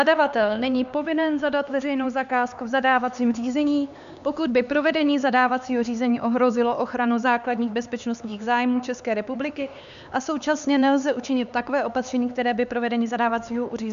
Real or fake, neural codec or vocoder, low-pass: fake; codec, 16 kHz, 4 kbps, X-Codec, HuBERT features, trained on LibriSpeech; 7.2 kHz